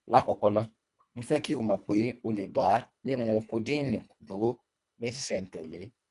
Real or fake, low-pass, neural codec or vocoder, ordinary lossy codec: fake; 10.8 kHz; codec, 24 kHz, 1.5 kbps, HILCodec; none